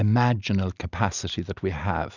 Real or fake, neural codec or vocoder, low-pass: real; none; 7.2 kHz